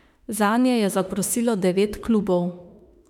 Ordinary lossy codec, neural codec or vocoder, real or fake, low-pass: none; autoencoder, 48 kHz, 32 numbers a frame, DAC-VAE, trained on Japanese speech; fake; 19.8 kHz